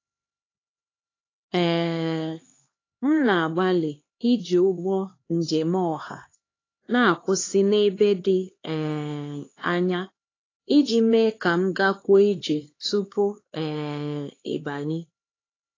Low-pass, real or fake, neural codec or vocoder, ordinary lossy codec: 7.2 kHz; fake; codec, 16 kHz, 2 kbps, X-Codec, HuBERT features, trained on LibriSpeech; AAC, 32 kbps